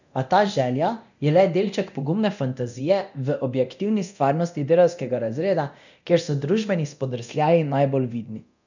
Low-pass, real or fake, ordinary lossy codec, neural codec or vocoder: 7.2 kHz; fake; none; codec, 24 kHz, 0.9 kbps, DualCodec